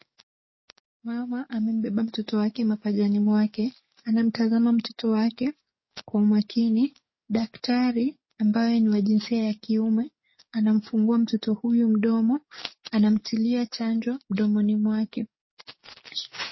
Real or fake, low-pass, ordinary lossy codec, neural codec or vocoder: fake; 7.2 kHz; MP3, 24 kbps; codec, 44.1 kHz, 7.8 kbps, DAC